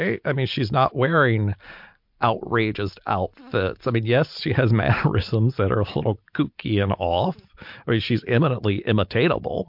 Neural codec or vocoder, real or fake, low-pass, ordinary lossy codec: none; real; 5.4 kHz; MP3, 48 kbps